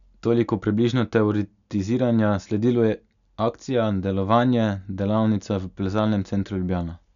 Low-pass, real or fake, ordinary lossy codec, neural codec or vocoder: 7.2 kHz; real; none; none